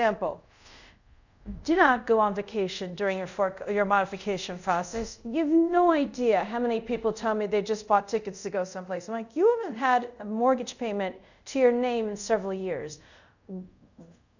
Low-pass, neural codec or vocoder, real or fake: 7.2 kHz; codec, 24 kHz, 0.5 kbps, DualCodec; fake